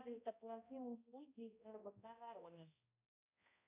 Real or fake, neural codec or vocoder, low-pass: fake; codec, 16 kHz, 0.5 kbps, X-Codec, HuBERT features, trained on balanced general audio; 3.6 kHz